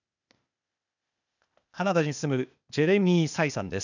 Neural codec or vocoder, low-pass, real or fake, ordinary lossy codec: codec, 16 kHz, 0.8 kbps, ZipCodec; 7.2 kHz; fake; none